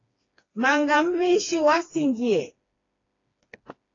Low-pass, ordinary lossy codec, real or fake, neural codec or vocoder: 7.2 kHz; AAC, 32 kbps; fake; codec, 16 kHz, 2 kbps, FreqCodec, smaller model